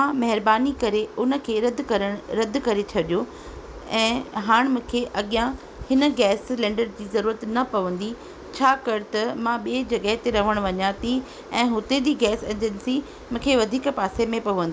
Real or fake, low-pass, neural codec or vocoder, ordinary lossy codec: real; none; none; none